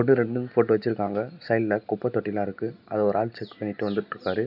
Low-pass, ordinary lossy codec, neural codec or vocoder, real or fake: 5.4 kHz; none; none; real